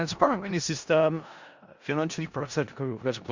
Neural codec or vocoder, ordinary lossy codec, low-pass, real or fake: codec, 16 kHz in and 24 kHz out, 0.4 kbps, LongCat-Audio-Codec, four codebook decoder; Opus, 64 kbps; 7.2 kHz; fake